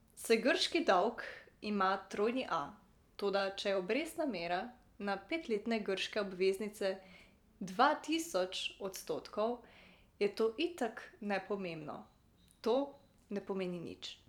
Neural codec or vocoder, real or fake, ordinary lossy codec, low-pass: vocoder, 44.1 kHz, 128 mel bands every 512 samples, BigVGAN v2; fake; none; 19.8 kHz